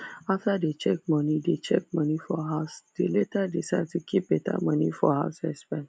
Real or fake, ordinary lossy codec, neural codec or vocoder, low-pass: real; none; none; none